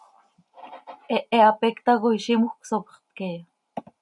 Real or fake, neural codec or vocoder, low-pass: real; none; 10.8 kHz